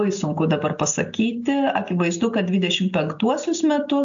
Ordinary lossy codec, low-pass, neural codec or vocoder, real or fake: AAC, 64 kbps; 7.2 kHz; none; real